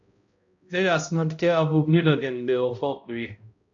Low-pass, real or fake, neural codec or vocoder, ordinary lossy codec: 7.2 kHz; fake; codec, 16 kHz, 0.5 kbps, X-Codec, HuBERT features, trained on balanced general audio; AAC, 64 kbps